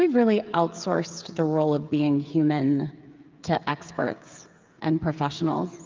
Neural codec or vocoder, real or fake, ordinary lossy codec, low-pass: codec, 16 kHz, 8 kbps, FreqCodec, smaller model; fake; Opus, 24 kbps; 7.2 kHz